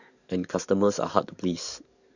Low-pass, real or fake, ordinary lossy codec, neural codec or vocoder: 7.2 kHz; fake; none; codec, 44.1 kHz, 7.8 kbps, DAC